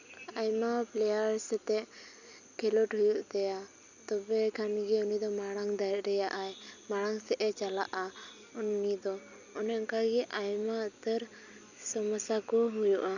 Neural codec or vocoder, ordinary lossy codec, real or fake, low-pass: none; none; real; 7.2 kHz